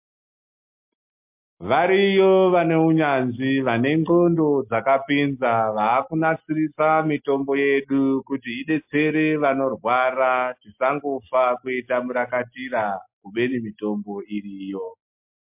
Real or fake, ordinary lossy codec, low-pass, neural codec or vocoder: real; MP3, 32 kbps; 3.6 kHz; none